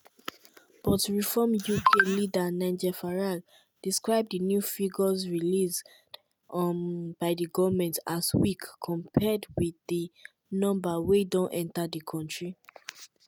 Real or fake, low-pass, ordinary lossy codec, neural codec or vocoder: real; none; none; none